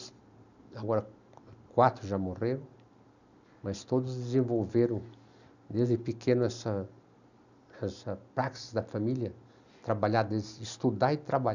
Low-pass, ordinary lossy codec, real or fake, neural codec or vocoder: 7.2 kHz; none; real; none